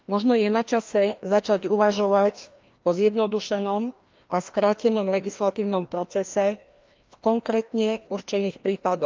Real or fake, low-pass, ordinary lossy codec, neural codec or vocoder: fake; 7.2 kHz; Opus, 24 kbps; codec, 16 kHz, 1 kbps, FreqCodec, larger model